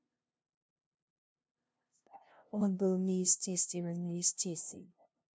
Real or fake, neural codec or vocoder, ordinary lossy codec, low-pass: fake; codec, 16 kHz, 0.5 kbps, FunCodec, trained on LibriTTS, 25 frames a second; none; none